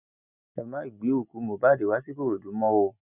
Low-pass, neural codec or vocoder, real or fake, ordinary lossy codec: 3.6 kHz; none; real; none